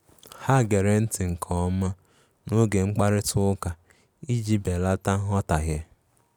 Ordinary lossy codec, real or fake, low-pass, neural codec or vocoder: none; real; none; none